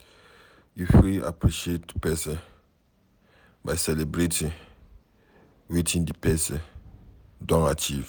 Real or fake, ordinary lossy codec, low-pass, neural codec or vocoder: real; none; none; none